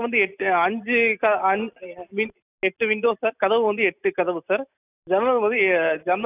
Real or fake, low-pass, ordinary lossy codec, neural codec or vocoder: real; 3.6 kHz; none; none